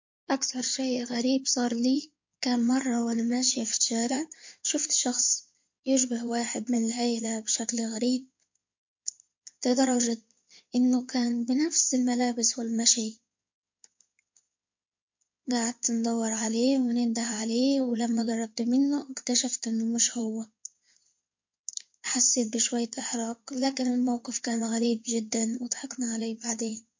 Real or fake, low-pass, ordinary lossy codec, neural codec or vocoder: fake; 7.2 kHz; MP3, 48 kbps; codec, 16 kHz in and 24 kHz out, 2.2 kbps, FireRedTTS-2 codec